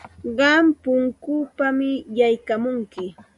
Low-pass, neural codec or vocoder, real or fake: 10.8 kHz; none; real